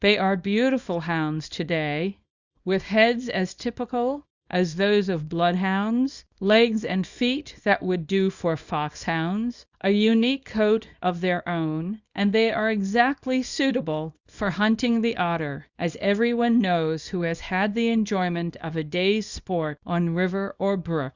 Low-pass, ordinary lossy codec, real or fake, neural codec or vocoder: 7.2 kHz; Opus, 64 kbps; fake; codec, 24 kHz, 0.9 kbps, WavTokenizer, small release